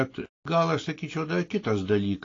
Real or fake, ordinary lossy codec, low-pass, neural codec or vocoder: real; AAC, 32 kbps; 7.2 kHz; none